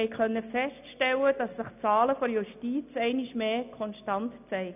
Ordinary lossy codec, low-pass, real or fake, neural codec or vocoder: none; 3.6 kHz; real; none